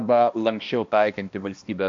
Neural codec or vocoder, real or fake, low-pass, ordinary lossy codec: codec, 16 kHz, 1 kbps, X-Codec, HuBERT features, trained on balanced general audio; fake; 7.2 kHz; MP3, 48 kbps